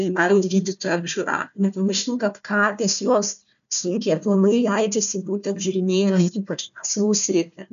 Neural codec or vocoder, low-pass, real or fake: codec, 16 kHz, 1 kbps, FunCodec, trained on Chinese and English, 50 frames a second; 7.2 kHz; fake